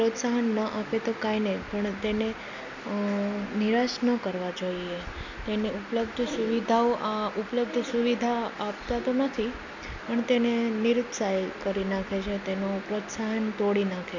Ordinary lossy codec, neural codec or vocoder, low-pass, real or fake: none; none; 7.2 kHz; real